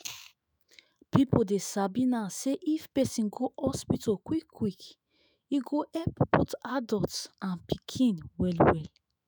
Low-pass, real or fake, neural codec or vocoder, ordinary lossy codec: none; fake; autoencoder, 48 kHz, 128 numbers a frame, DAC-VAE, trained on Japanese speech; none